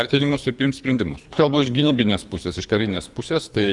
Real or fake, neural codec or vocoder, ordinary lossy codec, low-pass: fake; codec, 24 kHz, 3 kbps, HILCodec; Opus, 64 kbps; 10.8 kHz